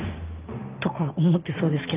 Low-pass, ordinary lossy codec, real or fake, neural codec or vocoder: 3.6 kHz; Opus, 24 kbps; real; none